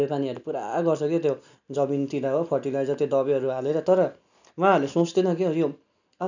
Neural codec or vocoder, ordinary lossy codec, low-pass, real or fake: none; none; 7.2 kHz; real